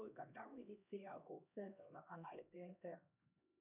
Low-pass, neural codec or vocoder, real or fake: 3.6 kHz; codec, 16 kHz, 1 kbps, X-Codec, HuBERT features, trained on LibriSpeech; fake